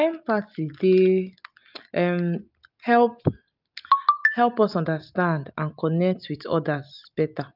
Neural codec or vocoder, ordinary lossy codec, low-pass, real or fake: none; none; 5.4 kHz; real